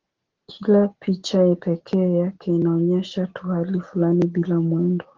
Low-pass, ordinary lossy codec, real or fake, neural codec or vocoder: 7.2 kHz; Opus, 16 kbps; real; none